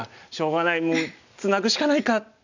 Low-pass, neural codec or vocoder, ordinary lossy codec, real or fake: 7.2 kHz; none; none; real